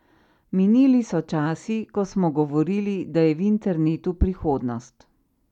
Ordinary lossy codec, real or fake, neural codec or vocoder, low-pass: none; real; none; 19.8 kHz